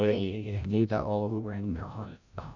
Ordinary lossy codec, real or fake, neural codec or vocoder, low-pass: none; fake; codec, 16 kHz, 0.5 kbps, FreqCodec, larger model; 7.2 kHz